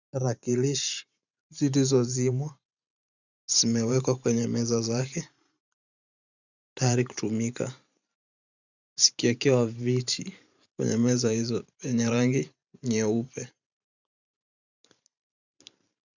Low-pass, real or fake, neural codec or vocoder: 7.2 kHz; fake; vocoder, 44.1 kHz, 128 mel bands every 512 samples, BigVGAN v2